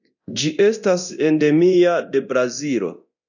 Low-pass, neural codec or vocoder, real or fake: 7.2 kHz; codec, 24 kHz, 0.9 kbps, DualCodec; fake